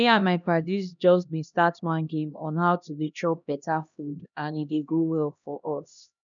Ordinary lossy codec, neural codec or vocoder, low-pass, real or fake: none; codec, 16 kHz, 1 kbps, X-Codec, HuBERT features, trained on LibriSpeech; 7.2 kHz; fake